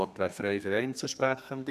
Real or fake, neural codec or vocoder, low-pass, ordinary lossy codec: fake; codec, 32 kHz, 1.9 kbps, SNAC; 14.4 kHz; none